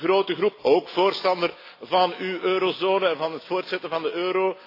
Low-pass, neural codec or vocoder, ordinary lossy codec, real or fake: 5.4 kHz; none; AAC, 32 kbps; real